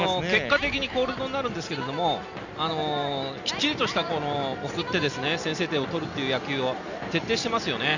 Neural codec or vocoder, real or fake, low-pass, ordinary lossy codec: none; real; 7.2 kHz; none